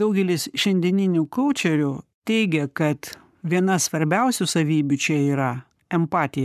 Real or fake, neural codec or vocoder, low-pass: fake; codec, 44.1 kHz, 7.8 kbps, Pupu-Codec; 14.4 kHz